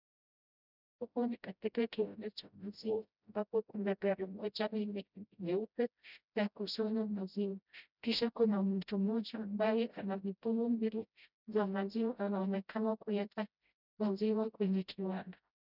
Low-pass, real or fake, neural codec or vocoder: 5.4 kHz; fake; codec, 16 kHz, 0.5 kbps, FreqCodec, smaller model